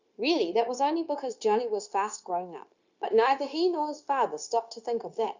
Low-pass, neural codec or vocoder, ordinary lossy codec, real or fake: 7.2 kHz; codec, 16 kHz, 0.9 kbps, LongCat-Audio-Codec; Opus, 64 kbps; fake